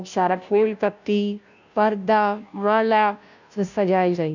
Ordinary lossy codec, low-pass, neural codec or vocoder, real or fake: none; 7.2 kHz; codec, 16 kHz, 0.5 kbps, FunCodec, trained on Chinese and English, 25 frames a second; fake